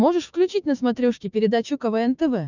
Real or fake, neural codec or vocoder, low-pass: fake; codec, 16 kHz, 6 kbps, DAC; 7.2 kHz